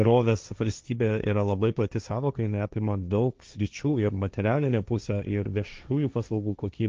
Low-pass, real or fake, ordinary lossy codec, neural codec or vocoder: 7.2 kHz; fake; Opus, 24 kbps; codec, 16 kHz, 1.1 kbps, Voila-Tokenizer